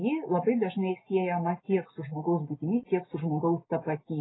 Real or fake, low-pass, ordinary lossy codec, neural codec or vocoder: real; 7.2 kHz; AAC, 16 kbps; none